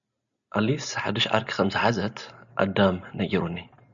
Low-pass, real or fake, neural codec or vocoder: 7.2 kHz; real; none